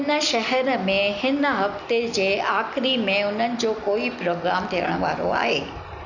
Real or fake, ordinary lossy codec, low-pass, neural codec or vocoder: real; none; 7.2 kHz; none